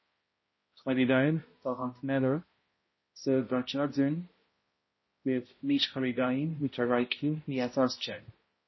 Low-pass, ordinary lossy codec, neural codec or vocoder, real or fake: 7.2 kHz; MP3, 24 kbps; codec, 16 kHz, 0.5 kbps, X-Codec, HuBERT features, trained on balanced general audio; fake